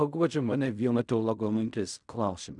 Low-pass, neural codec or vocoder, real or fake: 10.8 kHz; codec, 16 kHz in and 24 kHz out, 0.4 kbps, LongCat-Audio-Codec, fine tuned four codebook decoder; fake